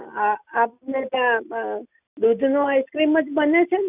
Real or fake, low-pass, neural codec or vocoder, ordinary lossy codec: real; 3.6 kHz; none; none